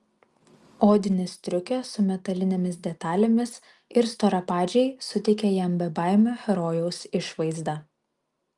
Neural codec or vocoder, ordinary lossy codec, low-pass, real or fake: none; Opus, 32 kbps; 10.8 kHz; real